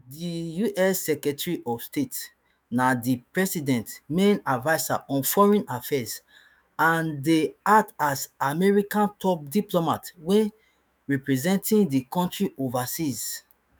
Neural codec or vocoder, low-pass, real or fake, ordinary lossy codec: autoencoder, 48 kHz, 128 numbers a frame, DAC-VAE, trained on Japanese speech; none; fake; none